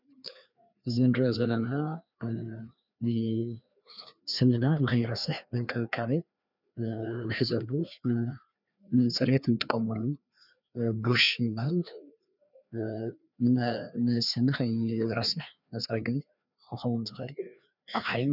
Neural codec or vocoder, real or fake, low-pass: codec, 16 kHz, 2 kbps, FreqCodec, larger model; fake; 5.4 kHz